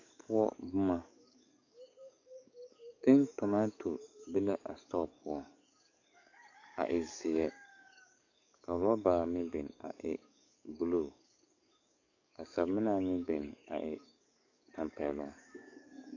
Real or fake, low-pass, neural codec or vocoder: fake; 7.2 kHz; codec, 44.1 kHz, 7.8 kbps, DAC